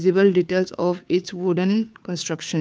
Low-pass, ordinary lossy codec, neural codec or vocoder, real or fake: none; none; codec, 16 kHz, 2 kbps, FunCodec, trained on Chinese and English, 25 frames a second; fake